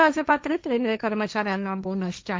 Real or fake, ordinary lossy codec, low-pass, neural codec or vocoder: fake; none; none; codec, 16 kHz, 1.1 kbps, Voila-Tokenizer